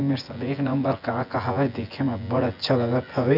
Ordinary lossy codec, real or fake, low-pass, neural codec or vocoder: none; fake; 5.4 kHz; vocoder, 24 kHz, 100 mel bands, Vocos